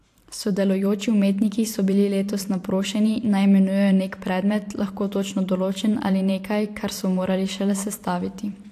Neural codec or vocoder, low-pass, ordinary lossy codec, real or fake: vocoder, 44.1 kHz, 128 mel bands every 512 samples, BigVGAN v2; 14.4 kHz; AAC, 64 kbps; fake